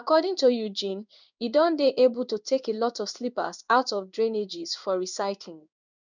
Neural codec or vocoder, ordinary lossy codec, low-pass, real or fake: codec, 16 kHz in and 24 kHz out, 1 kbps, XY-Tokenizer; none; 7.2 kHz; fake